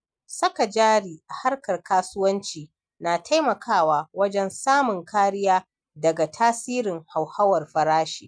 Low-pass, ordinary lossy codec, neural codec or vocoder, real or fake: 9.9 kHz; none; none; real